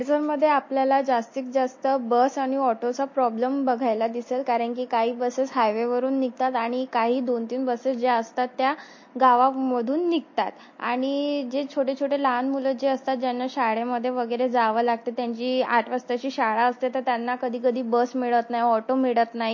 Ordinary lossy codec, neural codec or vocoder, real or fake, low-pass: MP3, 32 kbps; none; real; 7.2 kHz